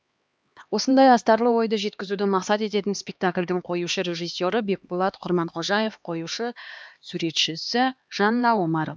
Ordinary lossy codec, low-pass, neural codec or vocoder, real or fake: none; none; codec, 16 kHz, 2 kbps, X-Codec, HuBERT features, trained on LibriSpeech; fake